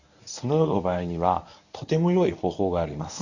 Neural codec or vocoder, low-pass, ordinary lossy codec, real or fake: codec, 24 kHz, 0.9 kbps, WavTokenizer, medium speech release version 1; 7.2 kHz; none; fake